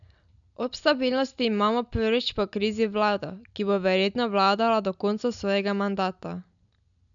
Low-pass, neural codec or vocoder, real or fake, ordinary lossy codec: 7.2 kHz; none; real; none